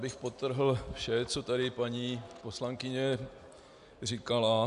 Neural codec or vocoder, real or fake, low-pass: none; real; 10.8 kHz